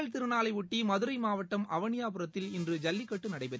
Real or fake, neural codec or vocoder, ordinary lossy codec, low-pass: real; none; none; none